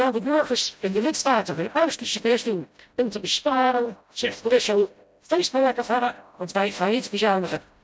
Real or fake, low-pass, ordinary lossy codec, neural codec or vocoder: fake; none; none; codec, 16 kHz, 0.5 kbps, FreqCodec, smaller model